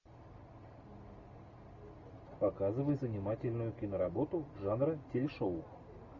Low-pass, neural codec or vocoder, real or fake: 7.2 kHz; none; real